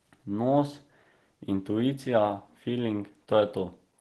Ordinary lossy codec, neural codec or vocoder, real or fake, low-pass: Opus, 16 kbps; none; real; 10.8 kHz